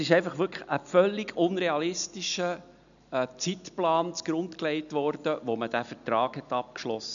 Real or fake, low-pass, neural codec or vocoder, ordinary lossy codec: real; 7.2 kHz; none; none